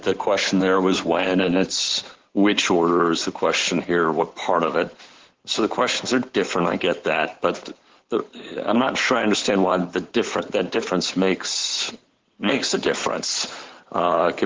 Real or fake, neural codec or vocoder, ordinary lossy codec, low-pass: fake; codec, 16 kHz in and 24 kHz out, 2.2 kbps, FireRedTTS-2 codec; Opus, 16 kbps; 7.2 kHz